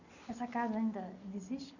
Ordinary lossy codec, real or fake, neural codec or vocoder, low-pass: none; real; none; 7.2 kHz